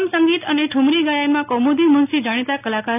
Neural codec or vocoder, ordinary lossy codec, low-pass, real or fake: none; none; 3.6 kHz; real